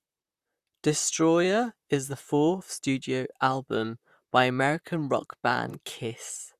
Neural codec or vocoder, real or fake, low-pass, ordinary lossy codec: vocoder, 44.1 kHz, 128 mel bands, Pupu-Vocoder; fake; 14.4 kHz; Opus, 64 kbps